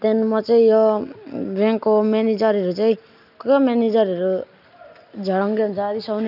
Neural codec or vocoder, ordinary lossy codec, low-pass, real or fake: none; none; 5.4 kHz; real